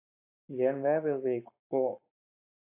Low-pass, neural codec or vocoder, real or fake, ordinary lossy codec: 3.6 kHz; codec, 44.1 kHz, 7.8 kbps, Pupu-Codec; fake; AAC, 32 kbps